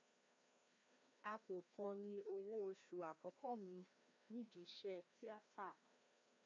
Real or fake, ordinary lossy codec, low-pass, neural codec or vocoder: fake; MP3, 48 kbps; 7.2 kHz; codec, 16 kHz, 1 kbps, FreqCodec, larger model